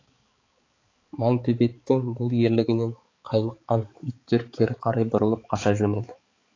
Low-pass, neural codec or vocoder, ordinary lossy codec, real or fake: 7.2 kHz; codec, 16 kHz, 4 kbps, X-Codec, HuBERT features, trained on balanced general audio; AAC, 32 kbps; fake